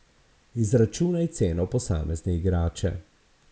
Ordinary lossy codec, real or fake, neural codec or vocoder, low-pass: none; real; none; none